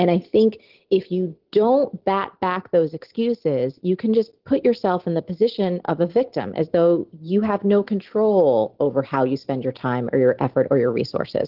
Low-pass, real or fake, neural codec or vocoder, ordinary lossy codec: 5.4 kHz; real; none; Opus, 16 kbps